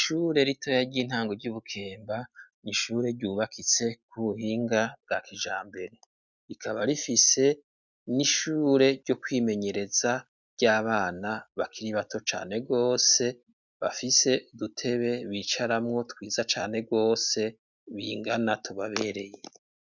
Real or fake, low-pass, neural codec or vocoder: real; 7.2 kHz; none